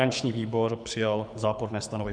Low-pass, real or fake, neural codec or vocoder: 9.9 kHz; fake; codec, 24 kHz, 6 kbps, HILCodec